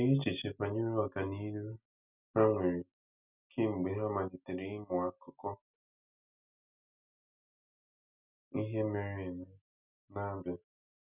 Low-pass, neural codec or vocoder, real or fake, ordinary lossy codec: 3.6 kHz; none; real; none